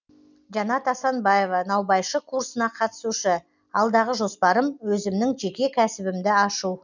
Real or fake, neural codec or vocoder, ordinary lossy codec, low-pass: real; none; none; 7.2 kHz